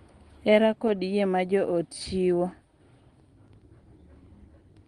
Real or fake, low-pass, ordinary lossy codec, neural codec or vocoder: real; 9.9 kHz; Opus, 24 kbps; none